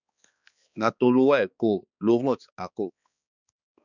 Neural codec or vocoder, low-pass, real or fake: codec, 16 kHz, 2 kbps, X-Codec, HuBERT features, trained on balanced general audio; 7.2 kHz; fake